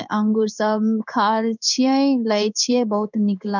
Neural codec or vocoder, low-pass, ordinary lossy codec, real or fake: codec, 16 kHz in and 24 kHz out, 1 kbps, XY-Tokenizer; 7.2 kHz; none; fake